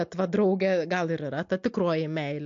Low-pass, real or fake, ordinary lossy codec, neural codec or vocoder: 7.2 kHz; real; MP3, 48 kbps; none